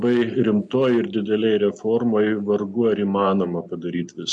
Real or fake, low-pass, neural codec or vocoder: real; 10.8 kHz; none